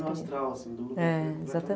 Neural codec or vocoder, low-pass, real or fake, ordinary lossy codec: none; none; real; none